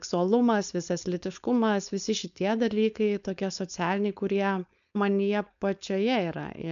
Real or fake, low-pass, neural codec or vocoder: fake; 7.2 kHz; codec, 16 kHz, 4.8 kbps, FACodec